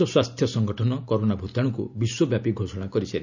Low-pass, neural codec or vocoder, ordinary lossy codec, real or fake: 7.2 kHz; none; none; real